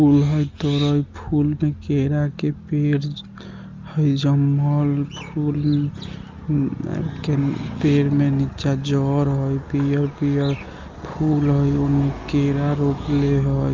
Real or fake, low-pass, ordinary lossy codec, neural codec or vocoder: real; 7.2 kHz; Opus, 24 kbps; none